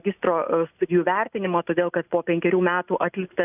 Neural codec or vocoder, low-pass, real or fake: none; 3.6 kHz; real